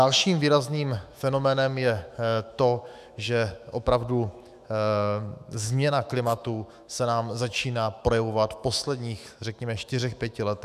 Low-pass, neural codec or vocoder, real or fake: 14.4 kHz; autoencoder, 48 kHz, 128 numbers a frame, DAC-VAE, trained on Japanese speech; fake